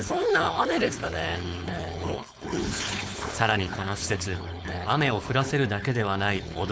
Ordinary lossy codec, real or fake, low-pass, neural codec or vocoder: none; fake; none; codec, 16 kHz, 4.8 kbps, FACodec